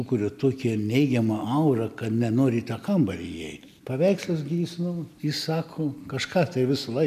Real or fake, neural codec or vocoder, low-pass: real; none; 14.4 kHz